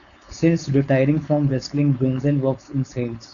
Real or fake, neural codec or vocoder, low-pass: fake; codec, 16 kHz, 4.8 kbps, FACodec; 7.2 kHz